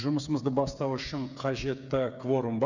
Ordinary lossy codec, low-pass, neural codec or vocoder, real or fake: none; 7.2 kHz; codec, 16 kHz, 8 kbps, FreqCodec, smaller model; fake